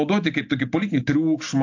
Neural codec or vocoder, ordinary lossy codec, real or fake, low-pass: none; AAC, 48 kbps; real; 7.2 kHz